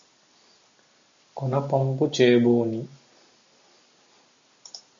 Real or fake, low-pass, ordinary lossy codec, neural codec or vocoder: real; 7.2 kHz; MP3, 64 kbps; none